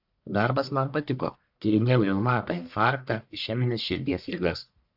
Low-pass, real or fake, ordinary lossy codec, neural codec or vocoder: 5.4 kHz; fake; AAC, 48 kbps; codec, 44.1 kHz, 1.7 kbps, Pupu-Codec